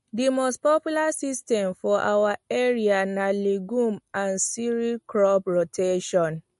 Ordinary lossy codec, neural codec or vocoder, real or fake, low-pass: MP3, 48 kbps; none; real; 14.4 kHz